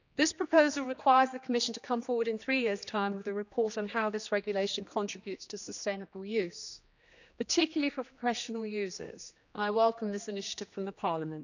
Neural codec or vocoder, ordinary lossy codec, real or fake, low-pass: codec, 16 kHz, 2 kbps, X-Codec, HuBERT features, trained on general audio; none; fake; 7.2 kHz